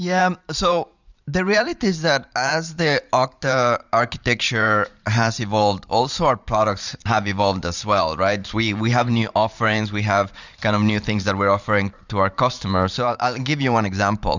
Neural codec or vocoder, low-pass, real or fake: vocoder, 44.1 kHz, 128 mel bands every 512 samples, BigVGAN v2; 7.2 kHz; fake